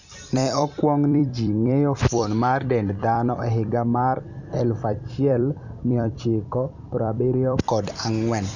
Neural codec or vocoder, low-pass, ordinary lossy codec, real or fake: vocoder, 44.1 kHz, 128 mel bands every 256 samples, BigVGAN v2; 7.2 kHz; none; fake